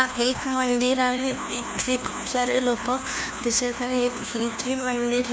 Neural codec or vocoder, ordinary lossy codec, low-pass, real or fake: codec, 16 kHz, 1 kbps, FunCodec, trained on LibriTTS, 50 frames a second; none; none; fake